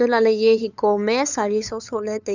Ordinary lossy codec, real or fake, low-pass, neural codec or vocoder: none; fake; 7.2 kHz; codec, 16 kHz, 8 kbps, FunCodec, trained on LibriTTS, 25 frames a second